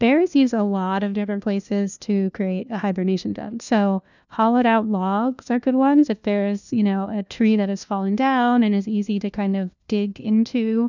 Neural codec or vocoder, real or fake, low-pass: codec, 16 kHz, 1 kbps, FunCodec, trained on LibriTTS, 50 frames a second; fake; 7.2 kHz